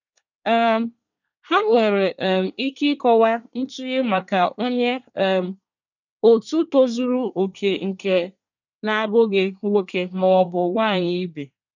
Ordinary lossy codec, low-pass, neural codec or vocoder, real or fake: none; 7.2 kHz; codec, 24 kHz, 1 kbps, SNAC; fake